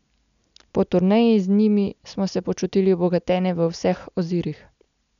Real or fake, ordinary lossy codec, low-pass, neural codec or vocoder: real; none; 7.2 kHz; none